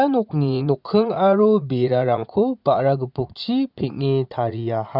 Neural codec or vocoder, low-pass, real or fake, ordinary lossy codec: vocoder, 44.1 kHz, 80 mel bands, Vocos; 5.4 kHz; fake; none